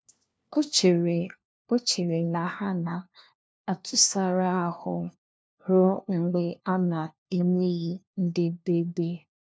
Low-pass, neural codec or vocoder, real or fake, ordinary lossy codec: none; codec, 16 kHz, 1 kbps, FunCodec, trained on LibriTTS, 50 frames a second; fake; none